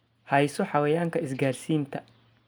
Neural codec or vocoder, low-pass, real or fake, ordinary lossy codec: none; none; real; none